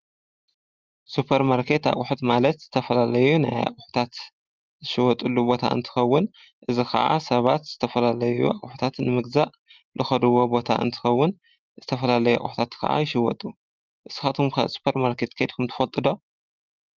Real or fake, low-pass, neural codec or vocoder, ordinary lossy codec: real; 7.2 kHz; none; Opus, 32 kbps